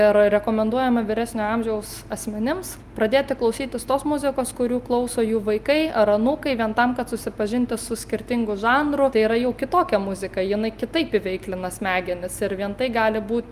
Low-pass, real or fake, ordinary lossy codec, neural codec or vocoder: 14.4 kHz; real; Opus, 32 kbps; none